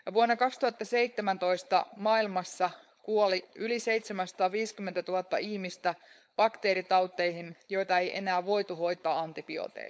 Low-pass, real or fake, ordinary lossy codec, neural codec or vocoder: none; fake; none; codec, 16 kHz, 4.8 kbps, FACodec